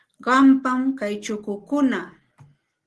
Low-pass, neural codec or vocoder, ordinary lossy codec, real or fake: 10.8 kHz; none; Opus, 16 kbps; real